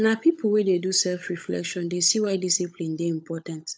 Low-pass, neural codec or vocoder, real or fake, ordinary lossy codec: none; codec, 16 kHz, 16 kbps, FunCodec, trained on LibriTTS, 50 frames a second; fake; none